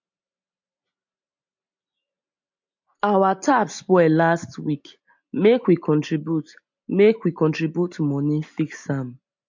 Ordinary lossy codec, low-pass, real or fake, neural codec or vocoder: MP3, 48 kbps; 7.2 kHz; real; none